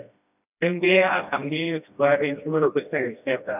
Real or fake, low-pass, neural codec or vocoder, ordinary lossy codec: fake; 3.6 kHz; codec, 16 kHz, 1 kbps, FreqCodec, smaller model; none